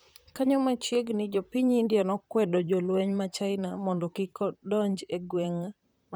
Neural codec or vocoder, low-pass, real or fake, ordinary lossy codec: vocoder, 44.1 kHz, 128 mel bands, Pupu-Vocoder; none; fake; none